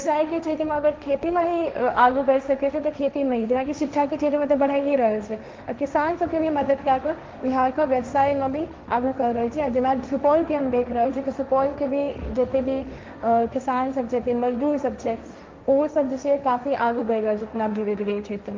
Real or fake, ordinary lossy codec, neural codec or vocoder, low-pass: fake; Opus, 32 kbps; codec, 16 kHz, 1.1 kbps, Voila-Tokenizer; 7.2 kHz